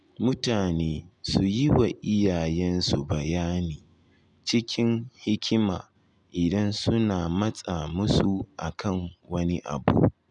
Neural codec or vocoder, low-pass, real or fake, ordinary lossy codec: none; 10.8 kHz; real; none